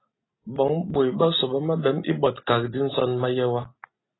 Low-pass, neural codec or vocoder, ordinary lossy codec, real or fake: 7.2 kHz; none; AAC, 16 kbps; real